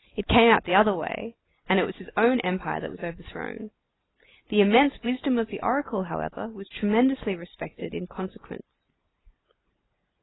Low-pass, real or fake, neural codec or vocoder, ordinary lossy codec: 7.2 kHz; real; none; AAC, 16 kbps